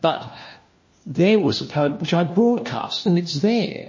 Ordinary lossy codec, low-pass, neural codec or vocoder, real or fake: MP3, 32 kbps; 7.2 kHz; codec, 16 kHz, 1 kbps, FunCodec, trained on LibriTTS, 50 frames a second; fake